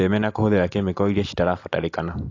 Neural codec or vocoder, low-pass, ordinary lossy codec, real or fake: none; 7.2 kHz; AAC, 48 kbps; real